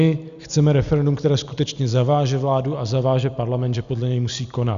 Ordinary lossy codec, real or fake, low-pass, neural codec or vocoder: Opus, 64 kbps; real; 7.2 kHz; none